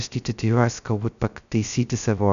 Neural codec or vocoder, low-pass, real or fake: codec, 16 kHz, 0.2 kbps, FocalCodec; 7.2 kHz; fake